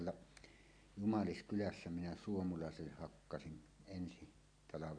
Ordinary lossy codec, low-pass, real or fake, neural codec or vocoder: MP3, 96 kbps; 9.9 kHz; real; none